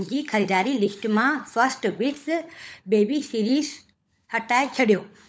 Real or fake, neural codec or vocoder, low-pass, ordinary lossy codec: fake; codec, 16 kHz, 16 kbps, FunCodec, trained on LibriTTS, 50 frames a second; none; none